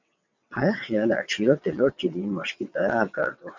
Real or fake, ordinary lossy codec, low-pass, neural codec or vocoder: fake; MP3, 64 kbps; 7.2 kHz; vocoder, 22.05 kHz, 80 mel bands, WaveNeXt